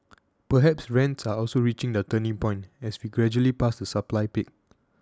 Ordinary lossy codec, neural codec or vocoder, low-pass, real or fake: none; none; none; real